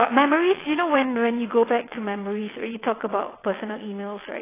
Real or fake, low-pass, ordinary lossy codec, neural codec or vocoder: fake; 3.6 kHz; AAC, 16 kbps; vocoder, 22.05 kHz, 80 mel bands, WaveNeXt